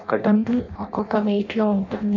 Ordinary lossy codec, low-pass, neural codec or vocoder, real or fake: none; 7.2 kHz; codec, 16 kHz in and 24 kHz out, 0.6 kbps, FireRedTTS-2 codec; fake